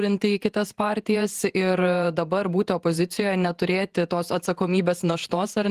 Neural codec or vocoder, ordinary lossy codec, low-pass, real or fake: vocoder, 48 kHz, 128 mel bands, Vocos; Opus, 24 kbps; 14.4 kHz; fake